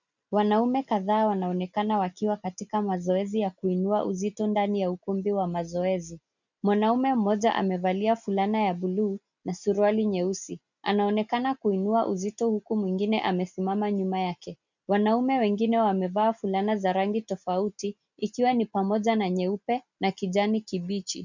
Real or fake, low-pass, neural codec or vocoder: real; 7.2 kHz; none